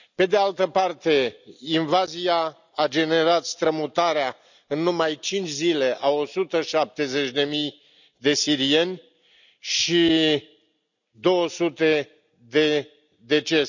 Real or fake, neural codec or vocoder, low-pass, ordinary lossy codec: real; none; 7.2 kHz; none